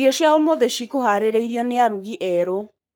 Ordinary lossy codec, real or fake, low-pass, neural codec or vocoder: none; fake; none; codec, 44.1 kHz, 3.4 kbps, Pupu-Codec